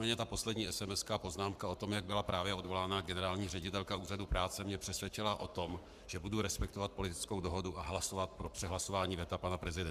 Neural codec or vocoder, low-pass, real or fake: codec, 44.1 kHz, 7.8 kbps, Pupu-Codec; 14.4 kHz; fake